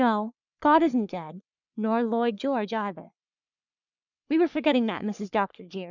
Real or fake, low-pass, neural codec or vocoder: fake; 7.2 kHz; codec, 44.1 kHz, 3.4 kbps, Pupu-Codec